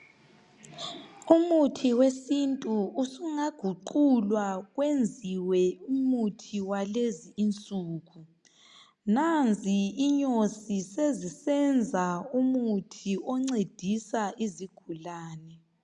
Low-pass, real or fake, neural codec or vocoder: 10.8 kHz; real; none